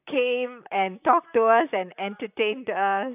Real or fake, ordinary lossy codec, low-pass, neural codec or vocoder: fake; none; 3.6 kHz; vocoder, 44.1 kHz, 128 mel bands, Pupu-Vocoder